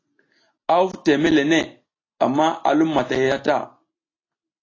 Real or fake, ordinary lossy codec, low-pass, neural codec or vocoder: real; AAC, 32 kbps; 7.2 kHz; none